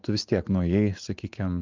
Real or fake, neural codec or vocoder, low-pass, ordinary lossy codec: fake; vocoder, 44.1 kHz, 80 mel bands, Vocos; 7.2 kHz; Opus, 32 kbps